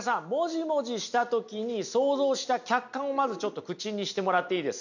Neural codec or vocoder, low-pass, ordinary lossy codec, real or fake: none; 7.2 kHz; none; real